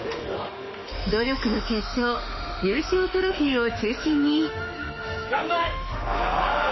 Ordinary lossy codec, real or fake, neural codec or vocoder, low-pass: MP3, 24 kbps; fake; autoencoder, 48 kHz, 32 numbers a frame, DAC-VAE, trained on Japanese speech; 7.2 kHz